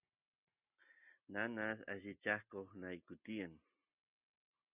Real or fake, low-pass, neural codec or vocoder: real; 3.6 kHz; none